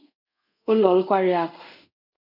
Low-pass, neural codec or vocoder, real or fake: 5.4 kHz; codec, 24 kHz, 0.5 kbps, DualCodec; fake